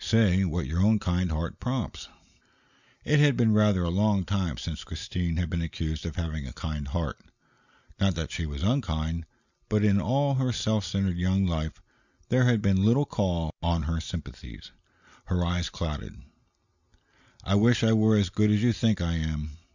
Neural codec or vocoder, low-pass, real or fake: none; 7.2 kHz; real